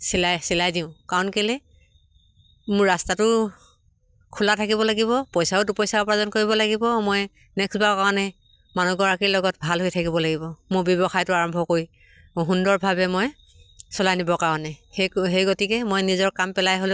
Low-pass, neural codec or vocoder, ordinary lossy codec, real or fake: none; none; none; real